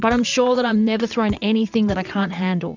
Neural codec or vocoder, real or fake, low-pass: vocoder, 22.05 kHz, 80 mel bands, WaveNeXt; fake; 7.2 kHz